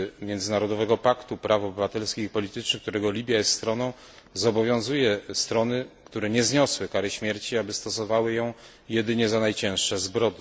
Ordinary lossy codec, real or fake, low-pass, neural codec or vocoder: none; real; none; none